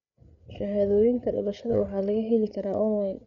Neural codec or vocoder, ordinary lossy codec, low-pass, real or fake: codec, 16 kHz, 8 kbps, FreqCodec, larger model; none; 7.2 kHz; fake